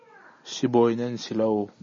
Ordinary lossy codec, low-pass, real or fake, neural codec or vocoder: MP3, 32 kbps; 7.2 kHz; real; none